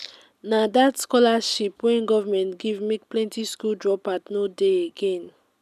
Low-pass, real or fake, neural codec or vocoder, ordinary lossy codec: 14.4 kHz; real; none; none